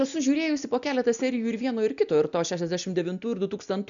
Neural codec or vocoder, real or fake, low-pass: none; real; 7.2 kHz